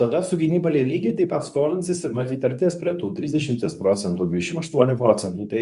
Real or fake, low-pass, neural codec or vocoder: fake; 10.8 kHz; codec, 24 kHz, 0.9 kbps, WavTokenizer, medium speech release version 2